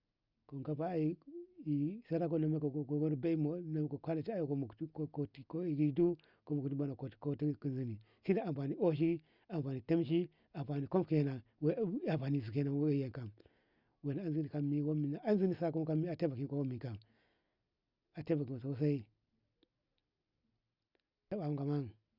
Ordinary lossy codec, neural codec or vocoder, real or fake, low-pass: Opus, 64 kbps; none; real; 5.4 kHz